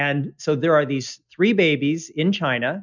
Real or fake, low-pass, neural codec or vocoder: real; 7.2 kHz; none